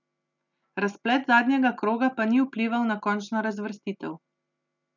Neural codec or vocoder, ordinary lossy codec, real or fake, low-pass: none; none; real; 7.2 kHz